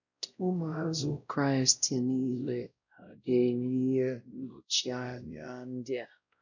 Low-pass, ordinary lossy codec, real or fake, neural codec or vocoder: 7.2 kHz; none; fake; codec, 16 kHz, 0.5 kbps, X-Codec, WavLM features, trained on Multilingual LibriSpeech